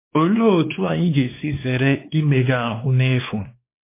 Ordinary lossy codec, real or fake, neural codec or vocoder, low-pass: MP3, 24 kbps; fake; codec, 16 kHz, 2 kbps, X-Codec, WavLM features, trained on Multilingual LibriSpeech; 3.6 kHz